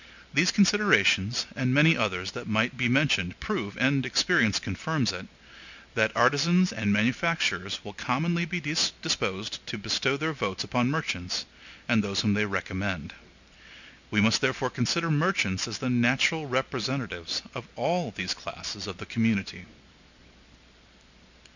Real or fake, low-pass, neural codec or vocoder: real; 7.2 kHz; none